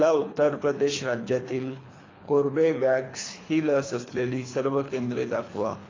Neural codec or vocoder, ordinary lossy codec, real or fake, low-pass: codec, 24 kHz, 3 kbps, HILCodec; AAC, 32 kbps; fake; 7.2 kHz